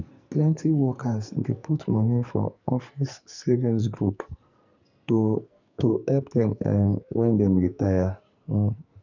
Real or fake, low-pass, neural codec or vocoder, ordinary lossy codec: fake; 7.2 kHz; codec, 44.1 kHz, 2.6 kbps, SNAC; none